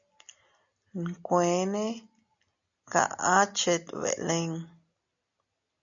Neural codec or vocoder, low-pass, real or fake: none; 7.2 kHz; real